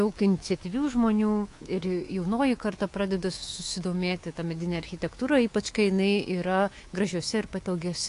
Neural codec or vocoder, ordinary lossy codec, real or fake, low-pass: codec, 24 kHz, 3.1 kbps, DualCodec; AAC, 48 kbps; fake; 10.8 kHz